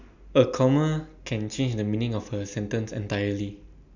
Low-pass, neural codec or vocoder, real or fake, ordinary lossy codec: 7.2 kHz; none; real; none